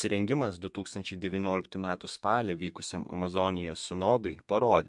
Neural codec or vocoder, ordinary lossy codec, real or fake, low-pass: codec, 32 kHz, 1.9 kbps, SNAC; MP3, 64 kbps; fake; 10.8 kHz